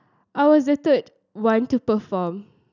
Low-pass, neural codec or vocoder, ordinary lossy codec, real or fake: 7.2 kHz; none; none; real